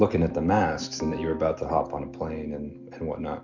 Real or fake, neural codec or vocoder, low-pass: real; none; 7.2 kHz